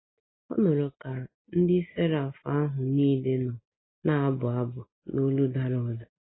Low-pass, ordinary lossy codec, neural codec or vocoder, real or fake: 7.2 kHz; AAC, 16 kbps; none; real